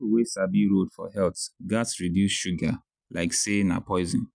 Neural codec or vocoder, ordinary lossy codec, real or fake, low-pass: none; none; real; 9.9 kHz